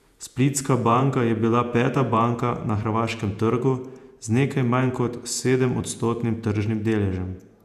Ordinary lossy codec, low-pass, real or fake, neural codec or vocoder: none; 14.4 kHz; fake; vocoder, 48 kHz, 128 mel bands, Vocos